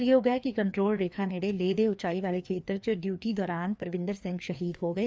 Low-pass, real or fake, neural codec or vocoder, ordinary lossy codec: none; fake; codec, 16 kHz, 2 kbps, FreqCodec, larger model; none